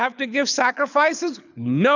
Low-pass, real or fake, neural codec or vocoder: 7.2 kHz; fake; codec, 24 kHz, 6 kbps, HILCodec